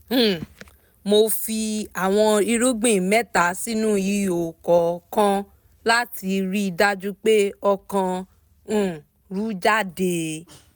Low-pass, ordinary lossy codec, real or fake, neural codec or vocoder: none; none; real; none